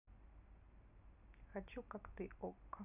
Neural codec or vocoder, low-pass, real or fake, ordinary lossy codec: none; 3.6 kHz; real; none